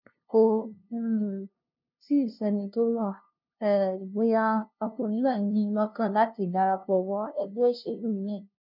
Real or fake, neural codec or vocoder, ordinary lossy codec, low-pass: fake; codec, 16 kHz, 0.5 kbps, FunCodec, trained on LibriTTS, 25 frames a second; none; 5.4 kHz